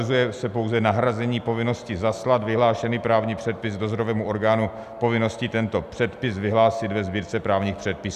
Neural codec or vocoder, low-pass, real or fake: none; 14.4 kHz; real